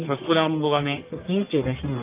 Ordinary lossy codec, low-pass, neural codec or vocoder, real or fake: Opus, 32 kbps; 3.6 kHz; codec, 44.1 kHz, 1.7 kbps, Pupu-Codec; fake